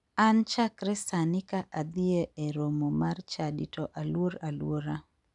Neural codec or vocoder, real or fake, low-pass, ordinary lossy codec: none; real; 10.8 kHz; none